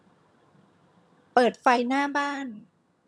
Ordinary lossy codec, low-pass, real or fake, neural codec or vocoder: none; none; fake; vocoder, 22.05 kHz, 80 mel bands, HiFi-GAN